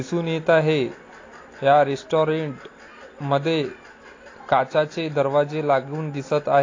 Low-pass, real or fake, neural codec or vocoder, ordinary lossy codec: 7.2 kHz; real; none; AAC, 32 kbps